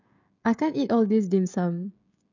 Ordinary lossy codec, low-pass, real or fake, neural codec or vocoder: none; 7.2 kHz; fake; codec, 16 kHz, 16 kbps, FreqCodec, smaller model